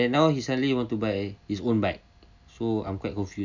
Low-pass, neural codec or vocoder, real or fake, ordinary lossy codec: 7.2 kHz; none; real; none